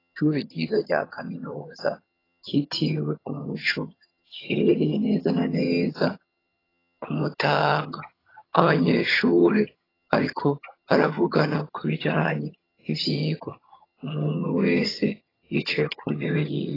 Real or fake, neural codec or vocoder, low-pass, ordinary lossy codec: fake; vocoder, 22.05 kHz, 80 mel bands, HiFi-GAN; 5.4 kHz; AAC, 24 kbps